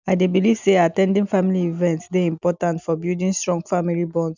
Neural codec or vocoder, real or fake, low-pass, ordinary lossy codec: none; real; 7.2 kHz; none